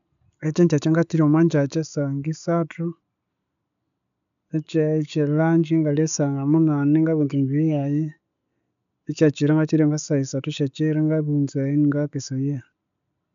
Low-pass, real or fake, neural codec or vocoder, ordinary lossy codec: 7.2 kHz; real; none; none